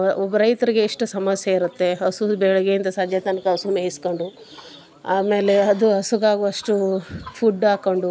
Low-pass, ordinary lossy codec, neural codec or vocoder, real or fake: none; none; none; real